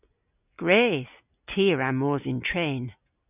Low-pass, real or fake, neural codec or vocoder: 3.6 kHz; real; none